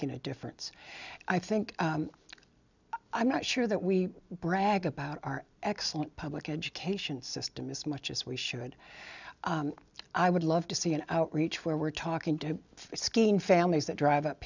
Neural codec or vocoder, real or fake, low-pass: none; real; 7.2 kHz